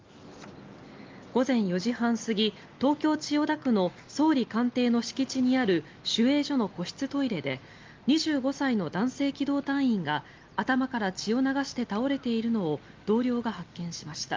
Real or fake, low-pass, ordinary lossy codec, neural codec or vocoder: real; 7.2 kHz; Opus, 24 kbps; none